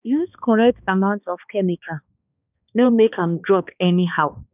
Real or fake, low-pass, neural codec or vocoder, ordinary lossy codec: fake; 3.6 kHz; codec, 16 kHz, 2 kbps, X-Codec, HuBERT features, trained on balanced general audio; none